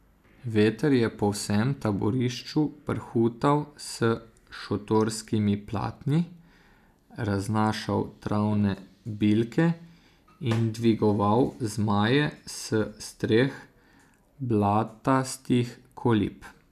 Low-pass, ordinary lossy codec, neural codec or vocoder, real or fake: 14.4 kHz; none; none; real